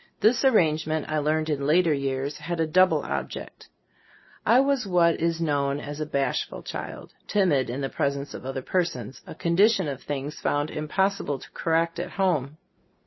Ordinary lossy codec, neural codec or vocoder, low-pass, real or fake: MP3, 24 kbps; none; 7.2 kHz; real